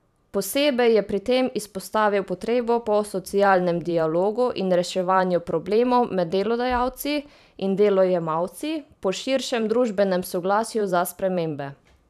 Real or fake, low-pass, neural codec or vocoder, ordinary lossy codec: fake; 14.4 kHz; vocoder, 44.1 kHz, 128 mel bands every 512 samples, BigVGAN v2; none